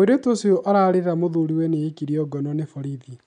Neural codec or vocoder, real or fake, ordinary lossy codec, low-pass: none; real; none; 9.9 kHz